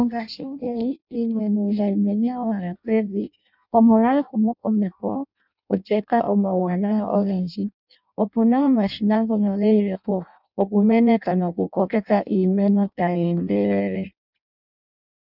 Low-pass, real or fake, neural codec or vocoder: 5.4 kHz; fake; codec, 16 kHz in and 24 kHz out, 0.6 kbps, FireRedTTS-2 codec